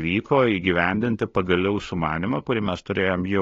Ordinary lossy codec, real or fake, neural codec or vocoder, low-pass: AAC, 32 kbps; fake; codec, 16 kHz, 2 kbps, FunCodec, trained on LibriTTS, 25 frames a second; 7.2 kHz